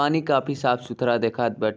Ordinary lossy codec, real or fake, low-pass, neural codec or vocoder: none; real; none; none